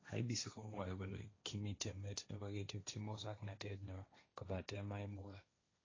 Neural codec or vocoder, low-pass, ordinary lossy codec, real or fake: codec, 16 kHz, 1.1 kbps, Voila-Tokenizer; 7.2 kHz; AAC, 32 kbps; fake